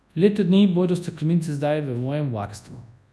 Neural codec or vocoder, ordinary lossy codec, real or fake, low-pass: codec, 24 kHz, 0.9 kbps, WavTokenizer, large speech release; none; fake; none